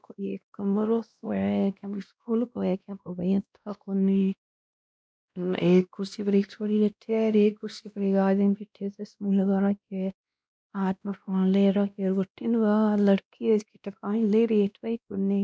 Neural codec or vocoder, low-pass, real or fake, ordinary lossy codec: codec, 16 kHz, 1 kbps, X-Codec, WavLM features, trained on Multilingual LibriSpeech; none; fake; none